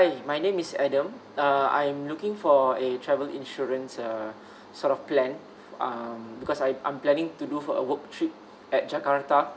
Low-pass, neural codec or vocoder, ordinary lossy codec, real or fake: none; none; none; real